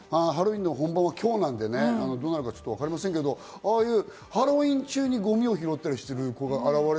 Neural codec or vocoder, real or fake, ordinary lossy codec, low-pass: none; real; none; none